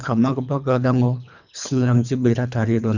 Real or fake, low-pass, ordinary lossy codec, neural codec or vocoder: fake; 7.2 kHz; none; codec, 24 kHz, 3 kbps, HILCodec